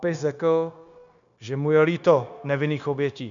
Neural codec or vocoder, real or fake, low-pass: codec, 16 kHz, 0.9 kbps, LongCat-Audio-Codec; fake; 7.2 kHz